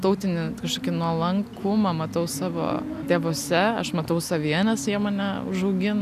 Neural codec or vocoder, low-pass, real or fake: none; 14.4 kHz; real